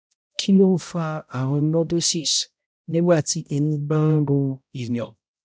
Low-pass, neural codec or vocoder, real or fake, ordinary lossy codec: none; codec, 16 kHz, 0.5 kbps, X-Codec, HuBERT features, trained on balanced general audio; fake; none